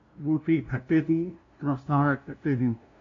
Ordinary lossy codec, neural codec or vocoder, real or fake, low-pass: AAC, 48 kbps; codec, 16 kHz, 0.5 kbps, FunCodec, trained on LibriTTS, 25 frames a second; fake; 7.2 kHz